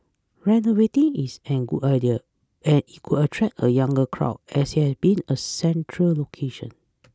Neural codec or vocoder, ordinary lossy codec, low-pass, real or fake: none; none; none; real